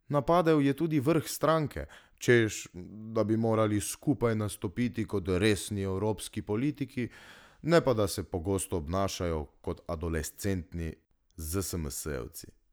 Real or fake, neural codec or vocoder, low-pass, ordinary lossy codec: real; none; none; none